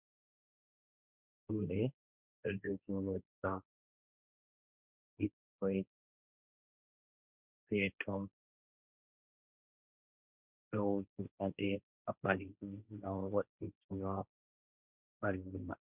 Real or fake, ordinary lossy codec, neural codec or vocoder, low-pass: fake; Opus, 64 kbps; codec, 16 kHz, 1.1 kbps, Voila-Tokenizer; 3.6 kHz